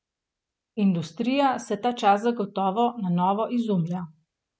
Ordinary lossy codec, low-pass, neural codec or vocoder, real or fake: none; none; none; real